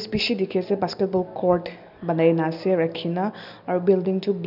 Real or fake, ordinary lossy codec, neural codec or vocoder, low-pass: real; none; none; 5.4 kHz